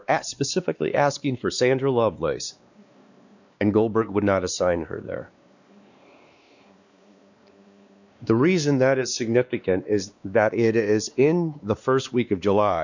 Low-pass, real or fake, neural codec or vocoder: 7.2 kHz; fake; codec, 16 kHz, 2 kbps, X-Codec, WavLM features, trained on Multilingual LibriSpeech